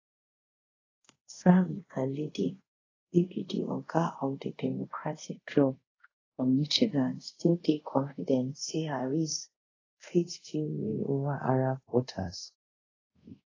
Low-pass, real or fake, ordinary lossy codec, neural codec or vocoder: 7.2 kHz; fake; AAC, 32 kbps; codec, 24 kHz, 0.5 kbps, DualCodec